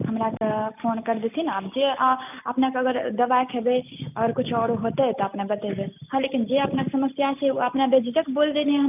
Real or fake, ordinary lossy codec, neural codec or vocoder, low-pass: real; none; none; 3.6 kHz